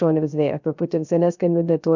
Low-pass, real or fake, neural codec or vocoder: 7.2 kHz; fake; codec, 16 kHz, 0.3 kbps, FocalCodec